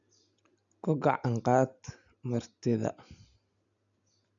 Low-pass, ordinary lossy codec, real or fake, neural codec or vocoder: 7.2 kHz; none; real; none